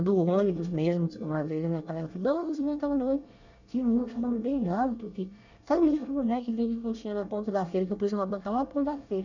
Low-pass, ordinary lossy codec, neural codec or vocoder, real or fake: 7.2 kHz; none; codec, 24 kHz, 1 kbps, SNAC; fake